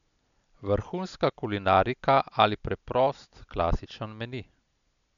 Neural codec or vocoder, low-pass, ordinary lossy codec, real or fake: none; 7.2 kHz; none; real